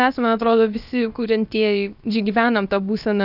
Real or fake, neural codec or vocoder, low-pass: fake; codec, 16 kHz, about 1 kbps, DyCAST, with the encoder's durations; 5.4 kHz